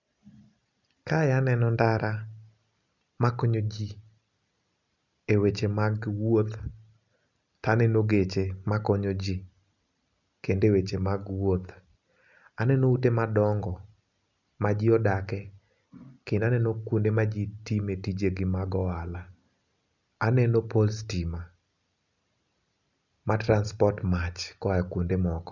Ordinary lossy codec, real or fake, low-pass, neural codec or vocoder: none; real; 7.2 kHz; none